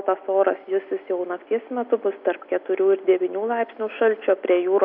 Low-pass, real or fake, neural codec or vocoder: 5.4 kHz; real; none